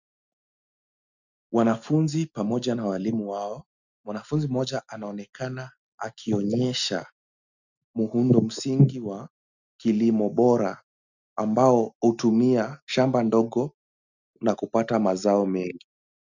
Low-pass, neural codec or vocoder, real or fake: 7.2 kHz; none; real